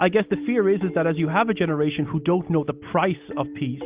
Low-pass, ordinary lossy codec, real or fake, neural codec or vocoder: 3.6 kHz; Opus, 32 kbps; real; none